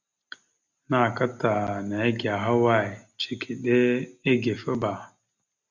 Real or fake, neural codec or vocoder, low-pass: real; none; 7.2 kHz